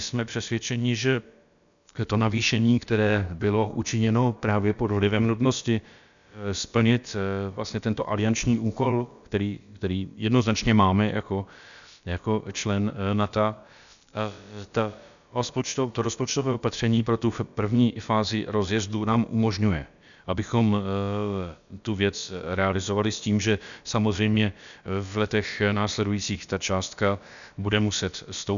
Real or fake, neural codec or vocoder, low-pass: fake; codec, 16 kHz, about 1 kbps, DyCAST, with the encoder's durations; 7.2 kHz